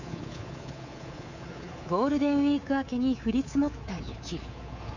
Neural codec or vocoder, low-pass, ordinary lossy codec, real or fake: codec, 24 kHz, 3.1 kbps, DualCodec; 7.2 kHz; none; fake